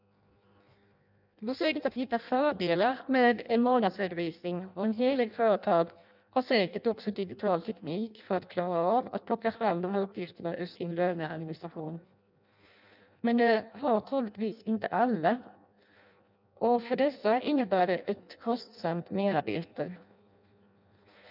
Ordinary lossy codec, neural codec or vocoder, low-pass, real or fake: none; codec, 16 kHz in and 24 kHz out, 0.6 kbps, FireRedTTS-2 codec; 5.4 kHz; fake